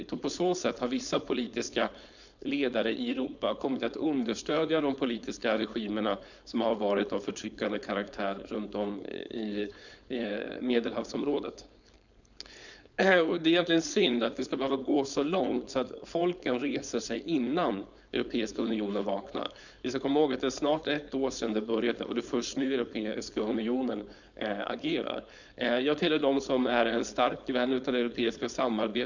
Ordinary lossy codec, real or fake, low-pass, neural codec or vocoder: none; fake; 7.2 kHz; codec, 16 kHz, 4.8 kbps, FACodec